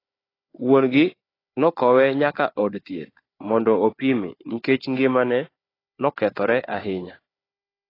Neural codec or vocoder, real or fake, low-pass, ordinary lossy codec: codec, 16 kHz, 4 kbps, FunCodec, trained on Chinese and English, 50 frames a second; fake; 5.4 kHz; AAC, 24 kbps